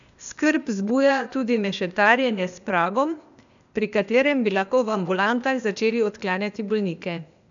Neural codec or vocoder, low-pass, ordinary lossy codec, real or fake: codec, 16 kHz, 0.8 kbps, ZipCodec; 7.2 kHz; none; fake